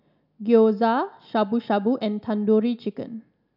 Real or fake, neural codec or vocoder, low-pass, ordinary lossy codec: real; none; 5.4 kHz; none